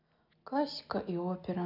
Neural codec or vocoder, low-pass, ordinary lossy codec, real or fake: none; 5.4 kHz; none; real